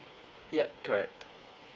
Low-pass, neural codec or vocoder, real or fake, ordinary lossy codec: none; codec, 16 kHz, 4 kbps, FreqCodec, smaller model; fake; none